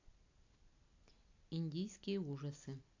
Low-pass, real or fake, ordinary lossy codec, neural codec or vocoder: 7.2 kHz; real; AAC, 48 kbps; none